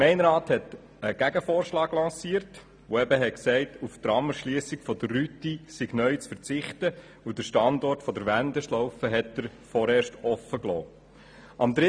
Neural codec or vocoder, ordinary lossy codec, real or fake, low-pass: none; none; real; 9.9 kHz